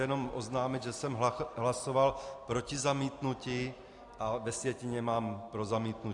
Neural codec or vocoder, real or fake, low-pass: none; real; 10.8 kHz